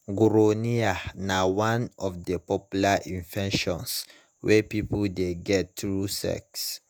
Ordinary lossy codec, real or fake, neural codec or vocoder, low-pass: none; fake; vocoder, 48 kHz, 128 mel bands, Vocos; none